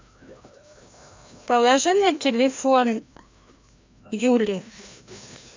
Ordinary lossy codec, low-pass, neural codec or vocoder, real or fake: MP3, 64 kbps; 7.2 kHz; codec, 16 kHz, 1 kbps, FreqCodec, larger model; fake